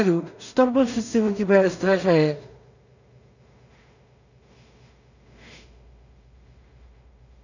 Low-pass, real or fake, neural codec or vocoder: 7.2 kHz; fake; codec, 16 kHz in and 24 kHz out, 0.4 kbps, LongCat-Audio-Codec, two codebook decoder